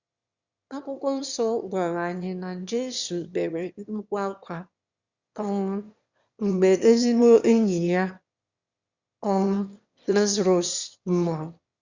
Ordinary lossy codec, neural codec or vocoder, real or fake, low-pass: Opus, 64 kbps; autoencoder, 22.05 kHz, a latent of 192 numbers a frame, VITS, trained on one speaker; fake; 7.2 kHz